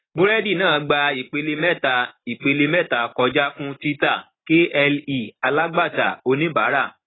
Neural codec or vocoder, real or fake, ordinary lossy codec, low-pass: none; real; AAC, 16 kbps; 7.2 kHz